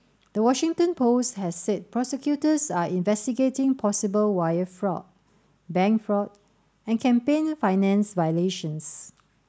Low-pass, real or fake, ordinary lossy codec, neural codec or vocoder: none; real; none; none